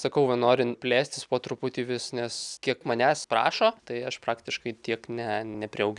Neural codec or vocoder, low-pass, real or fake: none; 10.8 kHz; real